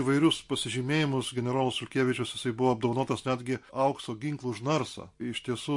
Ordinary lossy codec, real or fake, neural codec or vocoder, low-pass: MP3, 48 kbps; real; none; 10.8 kHz